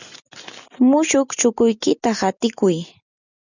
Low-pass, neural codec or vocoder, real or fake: 7.2 kHz; none; real